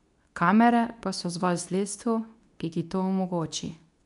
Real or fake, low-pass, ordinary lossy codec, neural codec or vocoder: fake; 10.8 kHz; none; codec, 24 kHz, 0.9 kbps, WavTokenizer, medium speech release version 2